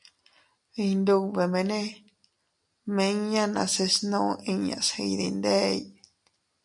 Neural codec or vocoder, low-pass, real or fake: none; 10.8 kHz; real